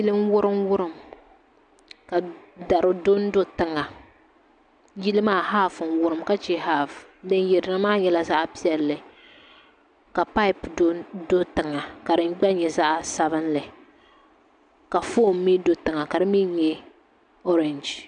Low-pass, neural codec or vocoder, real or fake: 9.9 kHz; none; real